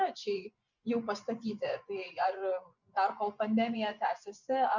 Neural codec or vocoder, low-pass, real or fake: vocoder, 24 kHz, 100 mel bands, Vocos; 7.2 kHz; fake